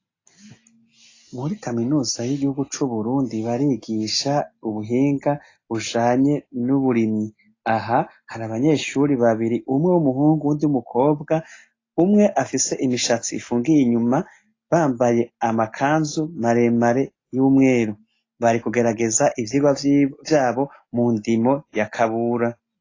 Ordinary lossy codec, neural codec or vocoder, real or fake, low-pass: AAC, 32 kbps; none; real; 7.2 kHz